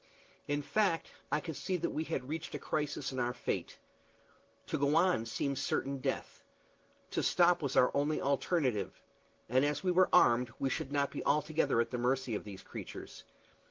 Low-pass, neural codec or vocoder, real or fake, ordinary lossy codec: 7.2 kHz; none; real; Opus, 16 kbps